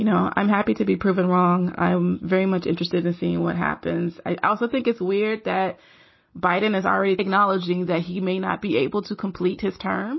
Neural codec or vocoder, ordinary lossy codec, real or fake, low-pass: none; MP3, 24 kbps; real; 7.2 kHz